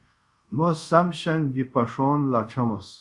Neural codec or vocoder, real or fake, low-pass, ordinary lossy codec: codec, 24 kHz, 0.5 kbps, DualCodec; fake; 10.8 kHz; Opus, 64 kbps